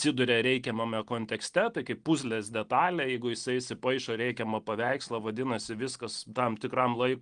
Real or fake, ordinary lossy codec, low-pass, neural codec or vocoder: real; Opus, 24 kbps; 9.9 kHz; none